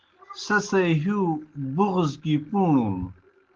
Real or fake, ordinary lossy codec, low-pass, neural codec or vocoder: real; Opus, 16 kbps; 7.2 kHz; none